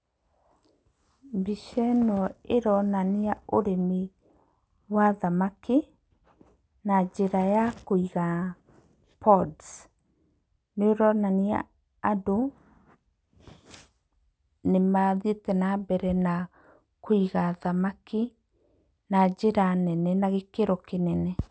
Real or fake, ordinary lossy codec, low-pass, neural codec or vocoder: real; none; none; none